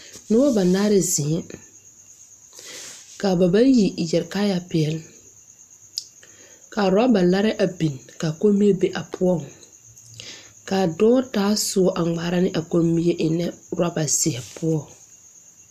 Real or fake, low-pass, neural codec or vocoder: real; 14.4 kHz; none